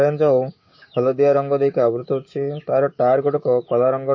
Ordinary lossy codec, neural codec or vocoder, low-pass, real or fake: MP3, 32 kbps; codec, 16 kHz, 6 kbps, DAC; 7.2 kHz; fake